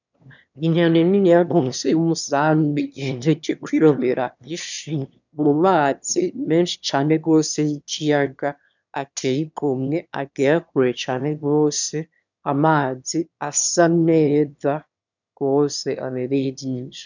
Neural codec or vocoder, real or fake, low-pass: autoencoder, 22.05 kHz, a latent of 192 numbers a frame, VITS, trained on one speaker; fake; 7.2 kHz